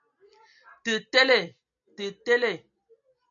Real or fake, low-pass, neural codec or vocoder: real; 7.2 kHz; none